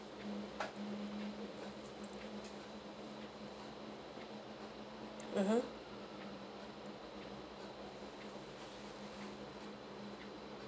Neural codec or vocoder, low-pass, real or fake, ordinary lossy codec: none; none; real; none